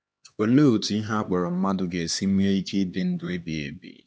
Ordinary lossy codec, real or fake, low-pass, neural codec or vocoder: none; fake; none; codec, 16 kHz, 2 kbps, X-Codec, HuBERT features, trained on LibriSpeech